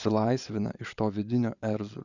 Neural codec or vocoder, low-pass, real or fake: vocoder, 22.05 kHz, 80 mel bands, Vocos; 7.2 kHz; fake